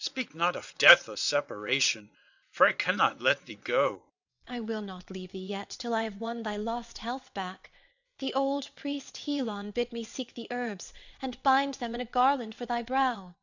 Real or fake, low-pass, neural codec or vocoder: fake; 7.2 kHz; vocoder, 22.05 kHz, 80 mel bands, WaveNeXt